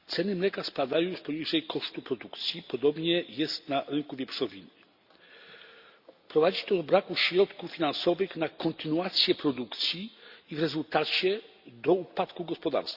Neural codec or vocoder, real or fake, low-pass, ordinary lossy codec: none; real; 5.4 kHz; Opus, 64 kbps